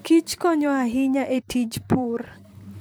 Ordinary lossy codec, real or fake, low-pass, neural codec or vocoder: none; fake; none; vocoder, 44.1 kHz, 128 mel bands, Pupu-Vocoder